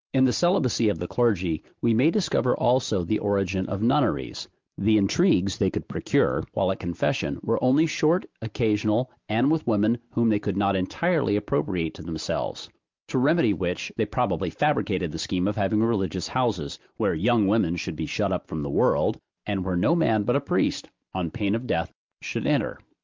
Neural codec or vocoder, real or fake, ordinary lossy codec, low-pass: none; real; Opus, 24 kbps; 7.2 kHz